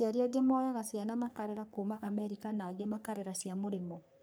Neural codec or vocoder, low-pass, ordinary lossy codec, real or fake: codec, 44.1 kHz, 3.4 kbps, Pupu-Codec; none; none; fake